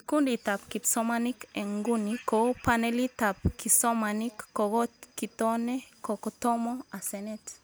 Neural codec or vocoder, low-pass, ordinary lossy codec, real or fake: none; none; none; real